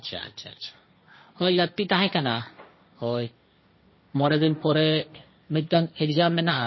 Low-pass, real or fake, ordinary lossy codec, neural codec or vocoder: 7.2 kHz; fake; MP3, 24 kbps; codec, 16 kHz, 1.1 kbps, Voila-Tokenizer